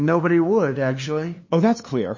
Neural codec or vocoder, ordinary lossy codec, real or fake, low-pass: codec, 16 kHz, 2 kbps, FunCodec, trained on LibriTTS, 25 frames a second; MP3, 32 kbps; fake; 7.2 kHz